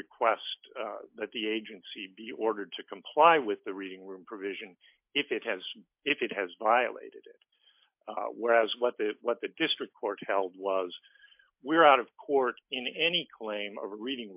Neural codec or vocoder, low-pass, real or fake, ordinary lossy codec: none; 3.6 kHz; real; MP3, 32 kbps